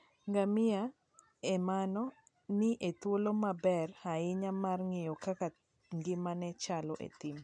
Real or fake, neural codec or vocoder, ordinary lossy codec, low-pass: real; none; none; 9.9 kHz